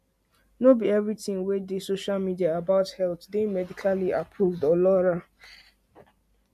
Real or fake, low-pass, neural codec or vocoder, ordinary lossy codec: real; 14.4 kHz; none; MP3, 64 kbps